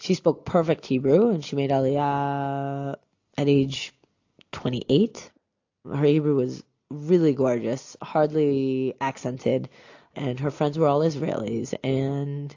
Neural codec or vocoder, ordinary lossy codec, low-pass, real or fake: none; AAC, 48 kbps; 7.2 kHz; real